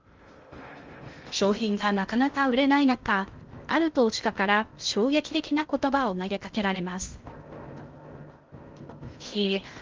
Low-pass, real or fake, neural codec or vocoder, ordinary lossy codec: 7.2 kHz; fake; codec, 16 kHz in and 24 kHz out, 0.6 kbps, FocalCodec, streaming, 2048 codes; Opus, 32 kbps